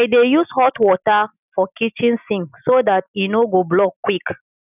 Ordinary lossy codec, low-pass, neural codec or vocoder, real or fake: none; 3.6 kHz; none; real